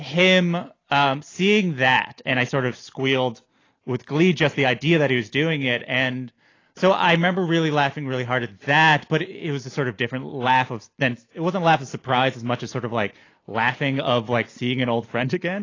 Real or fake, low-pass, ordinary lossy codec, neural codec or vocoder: real; 7.2 kHz; AAC, 32 kbps; none